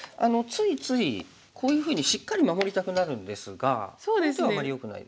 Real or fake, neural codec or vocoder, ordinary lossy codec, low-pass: real; none; none; none